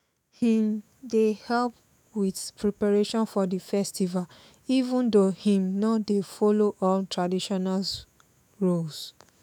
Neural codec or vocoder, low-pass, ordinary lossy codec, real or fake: autoencoder, 48 kHz, 128 numbers a frame, DAC-VAE, trained on Japanese speech; 19.8 kHz; none; fake